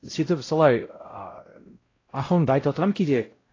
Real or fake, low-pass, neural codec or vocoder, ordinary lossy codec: fake; 7.2 kHz; codec, 16 kHz, 0.5 kbps, X-Codec, WavLM features, trained on Multilingual LibriSpeech; AAC, 32 kbps